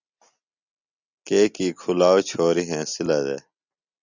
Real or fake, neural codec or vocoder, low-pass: real; none; 7.2 kHz